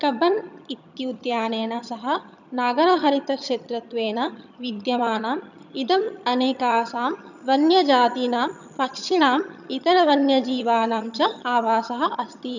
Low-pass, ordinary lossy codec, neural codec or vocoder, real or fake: 7.2 kHz; none; vocoder, 22.05 kHz, 80 mel bands, HiFi-GAN; fake